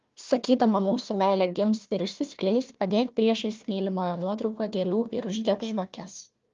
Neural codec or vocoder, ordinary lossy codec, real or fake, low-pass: codec, 16 kHz, 1 kbps, FunCodec, trained on Chinese and English, 50 frames a second; Opus, 32 kbps; fake; 7.2 kHz